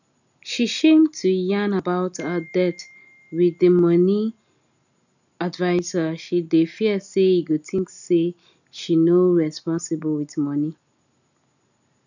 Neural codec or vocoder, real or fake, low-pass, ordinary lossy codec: none; real; 7.2 kHz; none